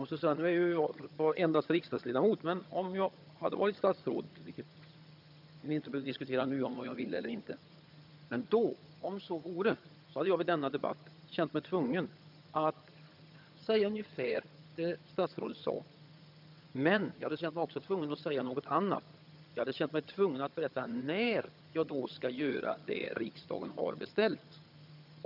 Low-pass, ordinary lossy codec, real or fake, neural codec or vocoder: 5.4 kHz; none; fake; vocoder, 22.05 kHz, 80 mel bands, HiFi-GAN